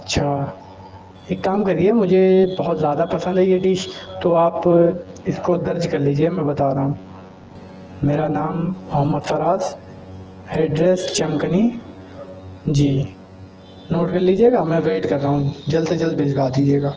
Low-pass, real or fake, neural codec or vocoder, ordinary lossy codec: 7.2 kHz; fake; vocoder, 24 kHz, 100 mel bands, Vocos; Opus, 16 kbps